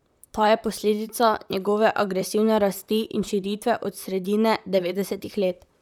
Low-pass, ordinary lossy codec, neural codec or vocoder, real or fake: 19.8 kHz; none; vocoder, 44.1 kHz, 128 mel bands, Pupu-Vocoder; fake